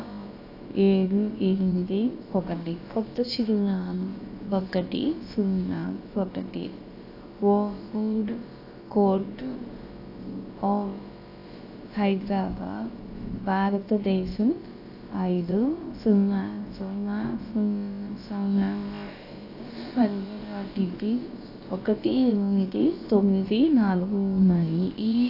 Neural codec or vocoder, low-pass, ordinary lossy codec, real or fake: codec, 16 kHz, about 1 kbps, DyCAST, with the encoder's durations; 5.4 kHz; AAC, 32 kbps; fake